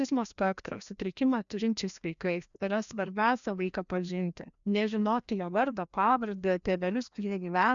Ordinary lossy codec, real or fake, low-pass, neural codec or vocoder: MP3, 96 kbps; fake; 7.2 kHz; codec, 16 kHz, 1 kbps, FreqCodec, larger model